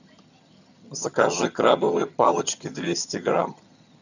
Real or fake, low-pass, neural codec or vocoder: fake; 7.2 kHz; vocoder, 22.05 kHz, 80 mel bands, HiFi-GAN